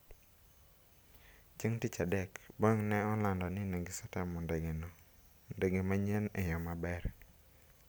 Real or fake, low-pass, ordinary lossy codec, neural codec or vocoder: real; none; none; none